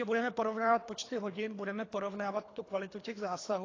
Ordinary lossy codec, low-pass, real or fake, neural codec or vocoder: AAC, 32 kbps; 7.2 kHz; fake; codec, 24 kHz, 3 kbps, HILCodec